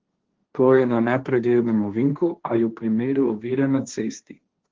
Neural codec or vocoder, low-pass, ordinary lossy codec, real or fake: codec, 16 kHz, 1.1 kbps, Voila-Tokenizer; 7.2 kHz; Opus, 16 kbps; fake